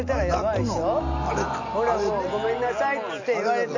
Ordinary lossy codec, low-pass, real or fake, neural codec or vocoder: none; 7.2 kHz; real; none